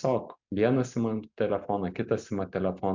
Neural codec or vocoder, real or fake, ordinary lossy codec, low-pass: none; real; MP3, 64 kbps; 7.2 kHz